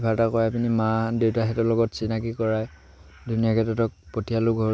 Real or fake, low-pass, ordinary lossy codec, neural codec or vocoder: real; none; none; none